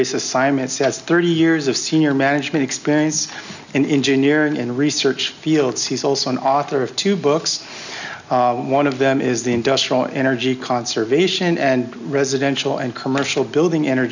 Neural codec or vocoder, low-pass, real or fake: none; 7.2 kHz; real